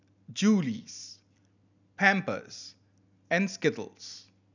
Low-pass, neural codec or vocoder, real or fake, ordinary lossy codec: 7.2 kHz; none; real; none